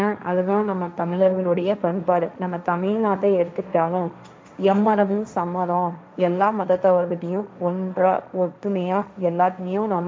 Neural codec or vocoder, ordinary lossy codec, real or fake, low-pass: codec, 16 kHz, 1.1 kbps, Voila-Tokenizer; none; fake; none